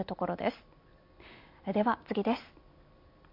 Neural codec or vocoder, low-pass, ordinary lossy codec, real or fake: none; 5.4 kHz; MP3, 48 kbps; real